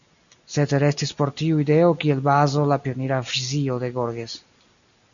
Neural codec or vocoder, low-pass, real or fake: none; 7.2 kHz; real